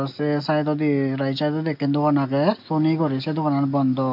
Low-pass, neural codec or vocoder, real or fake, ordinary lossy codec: 5.4 kHz; none; real; MP3, 48 kbps